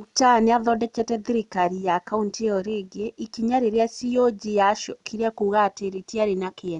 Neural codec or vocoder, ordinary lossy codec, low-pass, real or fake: none; none; 10.8 kHz; real